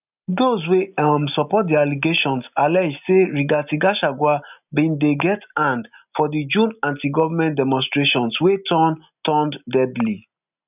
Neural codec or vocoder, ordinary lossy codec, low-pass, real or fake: none; none; 3.6 kHz; real